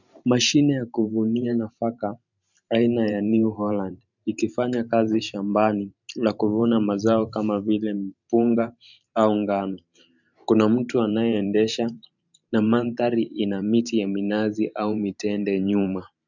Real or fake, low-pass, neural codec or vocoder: fake; 7.2 kHz; vocoder, 44.1 kHz, 128 mel bands every 512 samples, BigVGAN v2